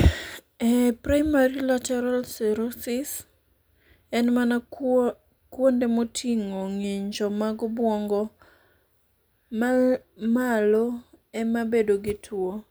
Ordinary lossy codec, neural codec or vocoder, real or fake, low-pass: none; none; real; none